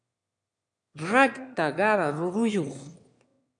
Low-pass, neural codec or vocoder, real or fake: 9.9 kHz; autoencoder, 22.05 kHz, a latent of 192 numbers a frame, VITS, trained on one speaker; fake